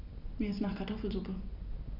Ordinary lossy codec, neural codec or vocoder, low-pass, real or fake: none; none; 5.4 kHz; real